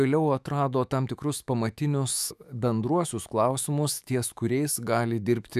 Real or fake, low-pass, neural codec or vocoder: fake; 14.4 kHz; autoencoder, 48 kHz, 128 numbers a frame, DAC-VAE, trained on Japanese speech